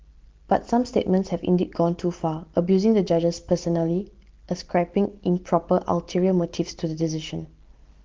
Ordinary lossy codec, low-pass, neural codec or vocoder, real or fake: Opus, 16 kbps; 7.2 kHz; none; real